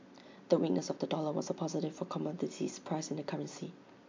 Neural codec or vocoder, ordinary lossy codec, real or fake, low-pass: none; MP3, 64 kbps; real; 7.2 kHz